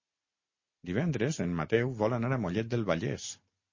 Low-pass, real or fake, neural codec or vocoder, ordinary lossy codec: 7.2 kHz; real; none; MP3, 32 kbps